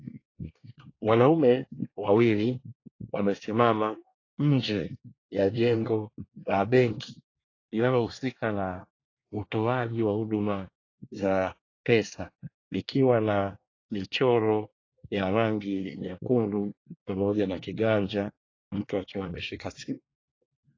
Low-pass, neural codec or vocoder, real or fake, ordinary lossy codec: 7.2 kHz; codec, 24 kHz, 1 kbps, SNAC; fake; AAC, 32 kbps